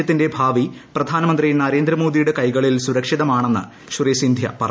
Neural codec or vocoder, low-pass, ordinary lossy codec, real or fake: none; none; none; real